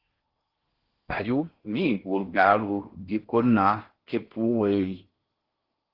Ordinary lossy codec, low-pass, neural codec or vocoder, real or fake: Opus, 16 kbps; 5.4 kHz; codec, 16 kHz in and 24 kHz out, 0.6 kbps, FocalCodec, streaming, 4096 codes; fake